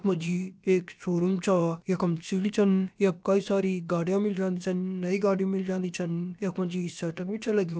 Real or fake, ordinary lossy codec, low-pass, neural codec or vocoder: fake; none; none; codec, 16 kHz, about 1 kbps, DyCAST, with the encoder's durations